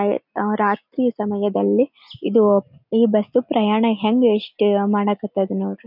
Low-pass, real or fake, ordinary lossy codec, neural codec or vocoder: 5.4 kHz; real; none; none